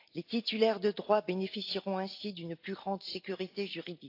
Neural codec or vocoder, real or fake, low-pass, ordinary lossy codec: none; real; 5.4 kHz; AAC, 32 kbps